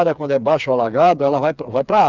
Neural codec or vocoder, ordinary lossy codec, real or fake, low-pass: codec, 16 kHz, 4 kbps, FreqCodec, smaller model; none; fake; 7.2 kHz